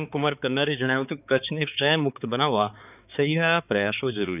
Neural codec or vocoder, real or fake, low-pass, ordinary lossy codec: codec, 16 kHz, 4 kbps, X-Codec, HuBERT features, trained on balanced general audio; fake; 3.6 kHz; none